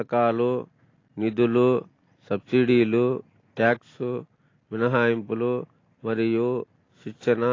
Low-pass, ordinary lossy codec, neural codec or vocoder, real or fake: 7.2 kHz; AAC, 32 kbps; none; real